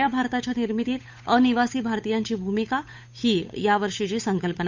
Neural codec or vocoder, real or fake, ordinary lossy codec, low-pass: codec, 16 kHz, 8 kbps, FunCodec, trained on Chinese and English, 25 frames a second; fake; MP3, 64 kbps; 7.2 kHz